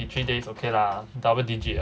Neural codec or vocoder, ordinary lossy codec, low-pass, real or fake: none; none; none; real